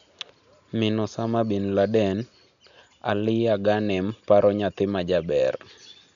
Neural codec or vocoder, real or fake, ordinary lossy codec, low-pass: none; real; none; 7.2 kHz